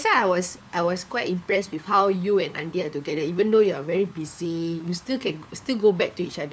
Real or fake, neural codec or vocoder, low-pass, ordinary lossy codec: fake; codec, 16 kHz, 4 kbps, FunCodec, trained on LibriTTS, 50 frames a second; none; none